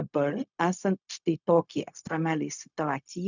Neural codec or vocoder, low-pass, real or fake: codec, 16 kHz, 0.4 kbps, LongCat-Audio-Codec; 7.2 kHz; fake